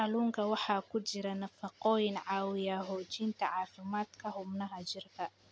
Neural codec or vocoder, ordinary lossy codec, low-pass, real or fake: none; none; none; real